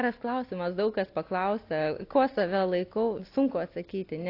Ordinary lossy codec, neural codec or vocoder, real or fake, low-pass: AAC, 32 kbps; none; real; 5.4 kHz